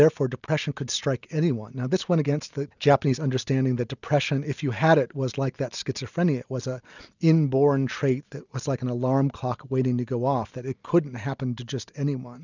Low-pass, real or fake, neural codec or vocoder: 7.2 kHz; real; none